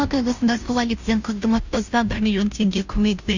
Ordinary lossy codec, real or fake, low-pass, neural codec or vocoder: none; fake; 7.2 kHz; codec, 16 kHz, 0.5 kbps, FunCodec, trained on Chinese and English, 25 frames a second